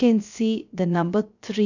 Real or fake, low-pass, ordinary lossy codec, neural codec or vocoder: fake; 7.2 kHz; none; codec, 16 kHz, 0.3 kbps, FocalCodec